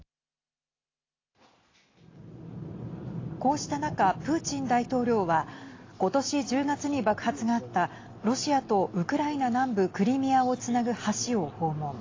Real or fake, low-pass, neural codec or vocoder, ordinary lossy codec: real; 7.2 kHz; none; AAC, 32 kbps